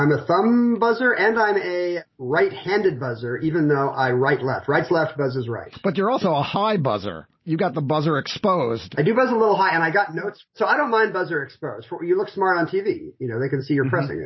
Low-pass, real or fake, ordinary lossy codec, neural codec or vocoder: 7.2 kHz; real; MP3, 24 kbps; none